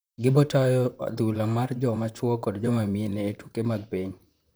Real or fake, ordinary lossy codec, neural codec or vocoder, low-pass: fake; none; vocoder, 44.1 kHz, 128 mel bands, Pupu-Vocoder; none